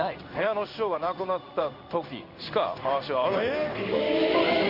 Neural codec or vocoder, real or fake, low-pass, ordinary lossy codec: codec, 16 kHz in and 24 kHz out, 1 kbps, XY-Tokenizer; fake; 5.4 kHz; none